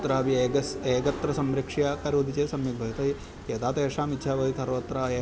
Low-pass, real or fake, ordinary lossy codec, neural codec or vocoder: none; real; none; none